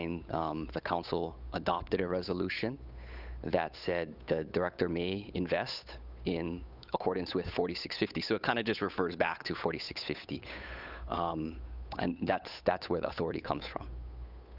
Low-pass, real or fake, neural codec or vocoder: 5.4 kHz; fake; codec, 16 kHz, 8 kbps, FunCodec, trained on Chinese and English, 25 frames a second